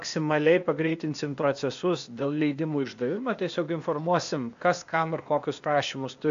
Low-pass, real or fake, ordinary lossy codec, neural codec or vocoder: 7.2 kHz; fake; AAC, 64 kbps; codec, 16 kHz, 0.8 kbps, ZipCodec